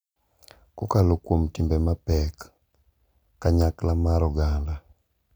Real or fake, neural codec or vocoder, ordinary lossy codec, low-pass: real; none; none; none